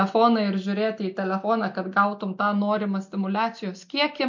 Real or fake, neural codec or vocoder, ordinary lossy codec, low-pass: real; none; MP3, 48 kbps; 7.2 kHz